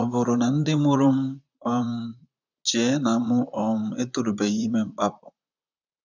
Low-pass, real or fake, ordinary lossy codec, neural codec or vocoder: 7.2 kHz; fake; none; vocoder, 44.1 kHz, 128 mel bands, Pupu-Vocoder